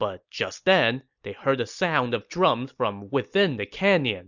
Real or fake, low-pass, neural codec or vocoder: real; 7.2 kHz; none